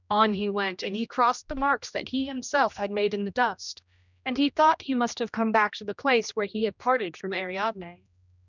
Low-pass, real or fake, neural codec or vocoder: 7.2 kHz; fake; codec, 16 kHz, 1 kbps, X-Codec, HuBERT features, trained on general audio